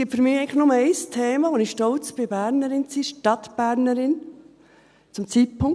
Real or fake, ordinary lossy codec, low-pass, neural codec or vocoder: real; none; none; none